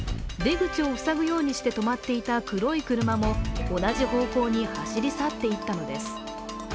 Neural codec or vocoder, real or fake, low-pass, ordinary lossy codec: none; real; none; none